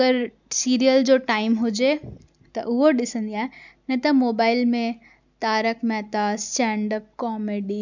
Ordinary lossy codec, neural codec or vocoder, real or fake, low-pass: none; none; real; 7.2 kHz